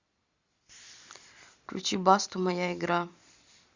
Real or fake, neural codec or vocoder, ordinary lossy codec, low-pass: real; none; Opus, 64 kbps; 7.2 kHz